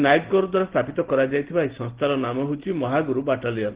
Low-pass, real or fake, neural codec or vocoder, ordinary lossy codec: 3.6 kHz; real; none; Opus, 16 kbps